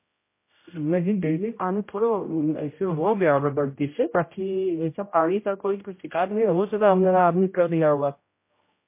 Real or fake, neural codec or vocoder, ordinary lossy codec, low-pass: fake; codec, 16 kHz, 0.5 kbps, X-Codec, HuBERT features, trained on general audio; MP3, 24 kbps; 3.6 kHz